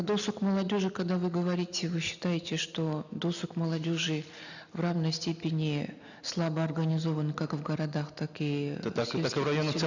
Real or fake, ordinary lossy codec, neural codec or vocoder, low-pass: real; none; none; 7.2 kHz